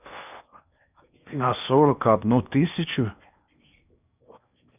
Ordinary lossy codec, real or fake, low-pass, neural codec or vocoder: none; fake; 3.6 kHz; codec, 16 kHz in and 24 kHz out, 0.8 kbps, FocalCodec, streaming, 65536 codes